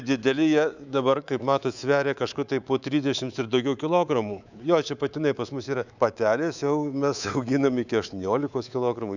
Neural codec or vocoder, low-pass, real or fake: codec, 24 kHz, 3.1 kbps, DualCodec; 7.2 kHz; fake